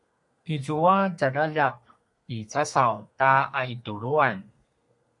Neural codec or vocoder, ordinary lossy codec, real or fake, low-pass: codec, 32 kHz, 1.9 kbps, SNAC; MP3, 64 kbps; fake; 10.8 kHz